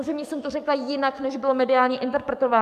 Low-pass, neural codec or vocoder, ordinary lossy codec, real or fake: 14.4 kHz; codec, 44.1 kHz, 7.8 kbps, DAC; MP3, 96 kbps; fake